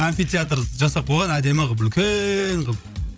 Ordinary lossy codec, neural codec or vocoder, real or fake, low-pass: none; codec, 16 kHz, 16 kbps, FreqCodec, larger model; fake; none